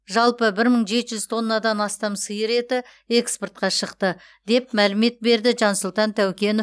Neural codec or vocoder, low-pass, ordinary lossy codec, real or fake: none; none; none; real